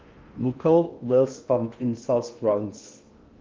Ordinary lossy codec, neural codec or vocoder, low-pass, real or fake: Opus, 32 kbps; codec, 16 kHz in and 24 kHz out, 0.8 kbps, FocalCodec, streaming, 65536 codes; 7.2 kHz; fake